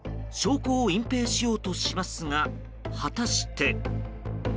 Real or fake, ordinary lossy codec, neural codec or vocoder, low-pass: real; none; none; none